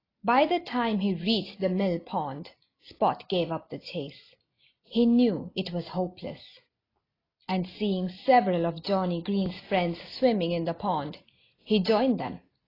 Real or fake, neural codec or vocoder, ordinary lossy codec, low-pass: real; none; AAC, 24 kbps; 5.4 kHz